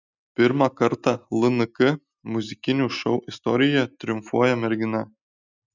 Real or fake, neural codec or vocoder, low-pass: real; none; 7.2 kHz